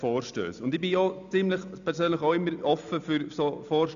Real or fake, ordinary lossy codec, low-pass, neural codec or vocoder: real; none; 7.2 kHz; none